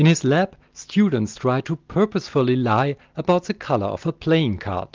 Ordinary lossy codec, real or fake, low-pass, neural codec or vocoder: Opus, 24 kbps; real; 7.2 kHz; none